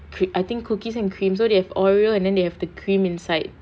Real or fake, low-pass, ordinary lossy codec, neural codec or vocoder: real; none; none; none